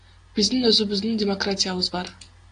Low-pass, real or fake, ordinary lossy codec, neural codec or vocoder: 9.9 kHz; real; AAC, 48 kbps; none